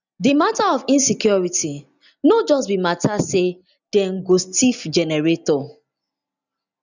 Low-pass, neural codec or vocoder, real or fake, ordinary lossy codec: 7.2 kHz; none; real; none